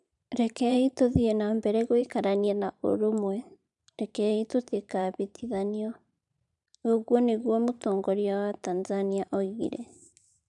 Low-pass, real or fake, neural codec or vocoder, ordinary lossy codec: 10.8 kHz; fake; vocoder, 44.1 kHz, 128 mel bands every 512 samples, BigVGAN v2; none